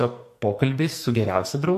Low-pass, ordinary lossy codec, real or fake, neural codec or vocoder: 14.4 kHz; MP3, 64 kbps; fake; codec, 44.1 kHz, 2.6 kbps, SNAC